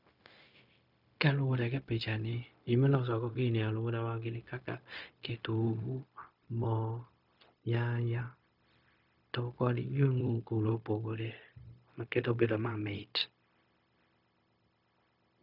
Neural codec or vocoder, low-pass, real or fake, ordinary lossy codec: codec, 16 kHz, 0.4 kbps, LongCat-Audio-Codec; 5.4 kHz; fake; none